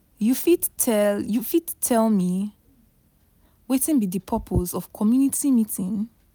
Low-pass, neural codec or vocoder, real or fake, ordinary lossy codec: none; none; real; none